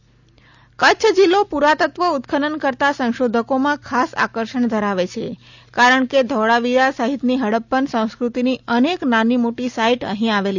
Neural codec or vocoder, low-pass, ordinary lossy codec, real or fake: none; 7.2 kHz; none; real